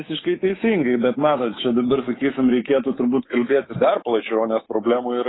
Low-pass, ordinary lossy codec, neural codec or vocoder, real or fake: 7.2 kHz; AAC, 16 kbps; codec, 24 kHz, 3.1 kbps, DualCodec; fake